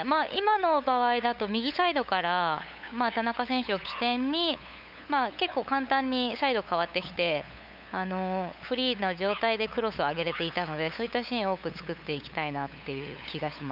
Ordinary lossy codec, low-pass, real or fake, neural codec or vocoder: none; 5.4 kHz; fake; codec, 16 kHz, 8 kbps, FunCodec, trained on LibriTTS, 25 frames a second